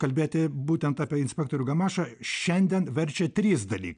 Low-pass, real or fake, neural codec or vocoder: 9.9 kHz; real; none